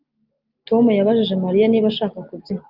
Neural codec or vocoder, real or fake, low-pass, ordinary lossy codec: none; real; 5.4 kHz; Opus, 24 kbps